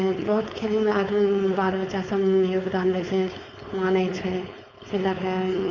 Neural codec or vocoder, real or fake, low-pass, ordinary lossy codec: codec, 16 kHz, 4.8 kbps, FACodec; fake; 7.2 kHz; none